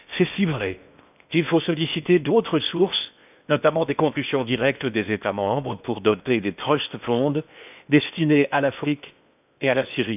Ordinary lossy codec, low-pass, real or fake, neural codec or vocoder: none; 3.6 kHz; fake; codec, 16 kHz in and 24 kHz out, 0.8 kbps, FocalCodec, streaming, 65536 codes